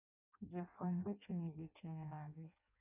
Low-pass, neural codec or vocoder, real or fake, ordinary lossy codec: 3.6 kHz; codec, 16 kHz in and 24 kHz out, 0.6 kbps, FireRedTTS-2 codec; fake; none